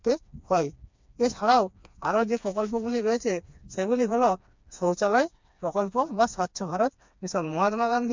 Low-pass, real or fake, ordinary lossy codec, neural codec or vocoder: 7.2 kHz; fake; MP3, 64 kbps; codec, 16 kHz, 2 kbps, FreqCodec, smaller model